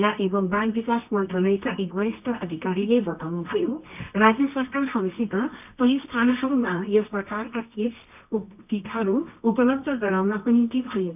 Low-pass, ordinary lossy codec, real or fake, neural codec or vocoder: 3.6 kHz; none; fake; codec, 24 kHz, 0.9 kbps, WavTokenizer, medium music audio release